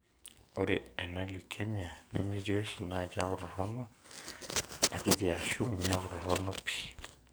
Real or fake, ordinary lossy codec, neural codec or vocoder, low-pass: fake; none; codec, 44.1 kHz, 2.6 kbps, SNAC; none